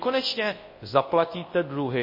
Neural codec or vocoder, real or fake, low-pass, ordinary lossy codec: codec, 24 kHz, 0.9 kbps, WavTokenizer, large speech release; fake; 5.4 kHz; MP3, 24 kbps